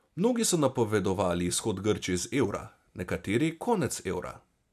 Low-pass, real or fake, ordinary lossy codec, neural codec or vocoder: 14.4 kHz; real; none; none